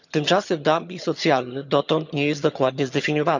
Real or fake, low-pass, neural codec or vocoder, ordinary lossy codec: fake; 7.2 kHz; vocoder, 22.05 kHz, 80 mel bands, HiFi-GAN; none